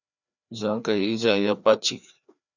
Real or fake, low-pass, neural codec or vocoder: fake; 7.2 kHz; codec, 16 kHz, 2 kbps, FreqCodec, larger model